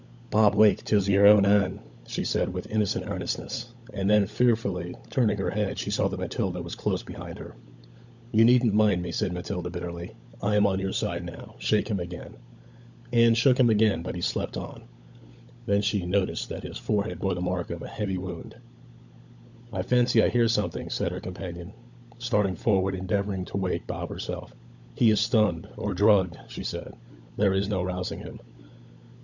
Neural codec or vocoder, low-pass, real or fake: codec, 16 kHz, 16 kbps, FunCodec, trained on LibriTTS, 50 frames a second; 7.2 kHz; fake